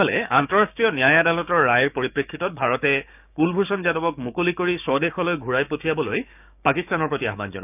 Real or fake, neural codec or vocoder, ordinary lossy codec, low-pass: fake; codec, 16 kHz, 6 kbps, DAC; none; 3.6 kHz